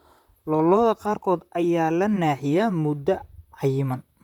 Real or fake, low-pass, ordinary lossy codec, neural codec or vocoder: fake; 19.8 kHz; none; vocoder, 44.1 kHz, 128 mel bands, Pupu-Vocoder